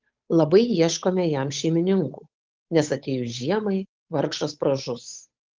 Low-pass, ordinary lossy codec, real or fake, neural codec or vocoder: 7.2 kHz; Opus, 32 kbps; fake; codec, 16 kHz, 8 kbps, FunCodec, trained on Chinese and English, 25 frames a second